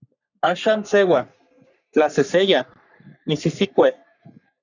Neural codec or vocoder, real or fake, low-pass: codec, 44.1 kHz, 3.4 kbps, Pupu-Codec; fake; 7.2 kHz